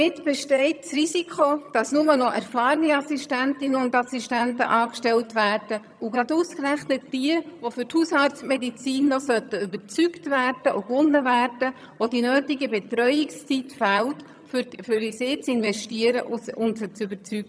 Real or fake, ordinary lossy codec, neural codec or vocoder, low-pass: fake; none; vocoder, 22.05 kHz, 80 mel bands, HiFi-GAN; none